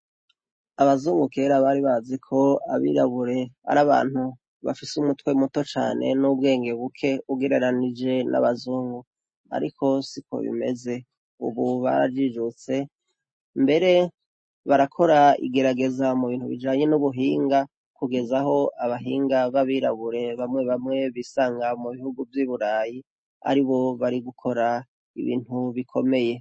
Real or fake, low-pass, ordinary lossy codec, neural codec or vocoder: real; 9.9 kHz; MP3, 32 kbps; none